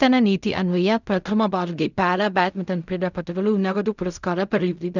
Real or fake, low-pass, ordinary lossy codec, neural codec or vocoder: fake; 7.2 kHz; none; codec, 16 kHz in and 24 kHz out, 0.4 kbps, LongCat-Audio-Codec, fine tuned four codebook decoder